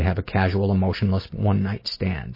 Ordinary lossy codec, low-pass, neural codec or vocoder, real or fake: MP3, 24 kbps; 5.4 kHz; none; real